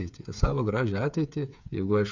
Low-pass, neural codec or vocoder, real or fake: 7.2 kHz; codec, 16 kHz, 8 kbps, FreqCodec, smaller model; fake